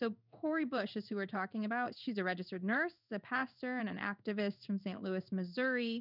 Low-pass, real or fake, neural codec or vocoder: 5.4 kHz; fake; codec, 16 kHz in and 24 kHz out, 1 kbps, XY-Tokenizer